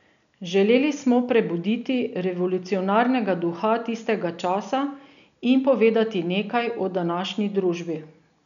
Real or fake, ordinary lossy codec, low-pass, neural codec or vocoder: real; none; 7.2 kHz; none